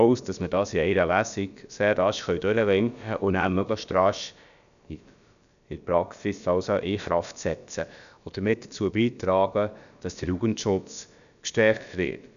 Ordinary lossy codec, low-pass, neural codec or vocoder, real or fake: none; 7.2 kHz; codec, 16 kHz, about 1 kbps, DyCAST, with the encoder's durations; fake